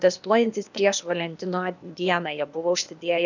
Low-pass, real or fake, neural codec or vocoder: 7.2 kHz; fake; codec, 16 kHz, 0.8 kbps, ZipCodec